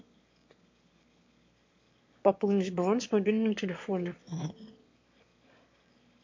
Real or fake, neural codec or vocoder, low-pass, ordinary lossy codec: fake; autoencoder, 22.05 kHz, a latent of 192 numbers a frame, VITS, trained on one speaker; 7.2 kHz; MP3, 48 kbps